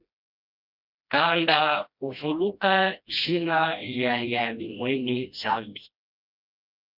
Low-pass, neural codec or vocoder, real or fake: 5.4 kHz; codec, 16 kHz, 1 kbps, FreqCodec, smaller model; fake